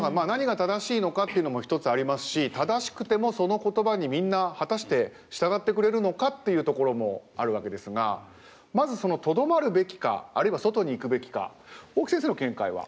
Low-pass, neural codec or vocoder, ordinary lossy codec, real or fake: none; none; none; real